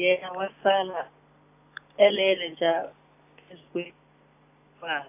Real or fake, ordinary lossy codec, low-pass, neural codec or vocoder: real; AAC, 32 kbps; 3.6 kHz; none